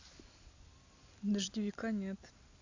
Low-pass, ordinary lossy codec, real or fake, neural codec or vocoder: 7.2 kHz; AAC, 48 kbps; real; none